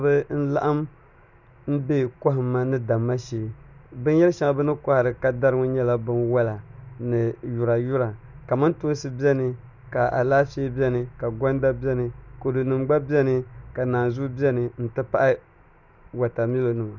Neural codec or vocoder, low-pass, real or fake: none; 7.2 kHz; real